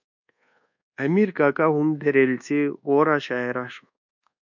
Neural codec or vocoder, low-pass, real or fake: codec, 24 kHz, 1.2 kbps, DualCodec; 7.2 kHz; fake